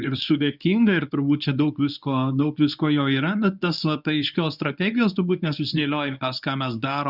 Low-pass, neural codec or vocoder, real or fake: 5.4 kHz; codec, 24 kHz, 0.9 kbps, WavTokenizer, medium speech release version 1; fake